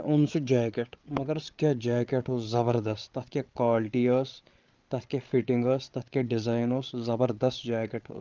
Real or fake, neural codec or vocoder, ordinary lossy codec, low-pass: fake; codec, 16 kHz, 8 kbps, FreqCodec, larger model; Opus, 24 kbps; 7.2 kHz